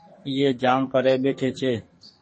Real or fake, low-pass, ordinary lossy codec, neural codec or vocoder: fake; 10.8 kHz; MP3, 32 kbps; codec, 44.1 kHz, 2.6 kbps, SNAC